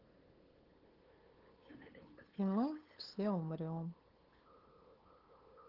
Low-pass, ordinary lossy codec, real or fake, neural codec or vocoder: 5.4 kHz; Opus, 16 kbps; fake; codec, 16 kHz, 8 kbps, FunCodec, trained on LibriTTS, 25 frames a second